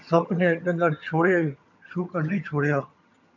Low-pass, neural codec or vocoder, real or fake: 7.2 kHz; vocoder, 22.05 kHz, 80 mel bands, HiFi-GAN; fake